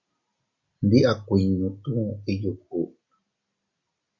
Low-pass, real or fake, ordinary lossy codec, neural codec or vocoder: 7.2 kHz; real; Opus, 64 kbps; none